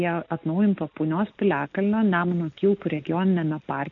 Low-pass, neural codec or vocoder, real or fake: 7.2 kHz; none; real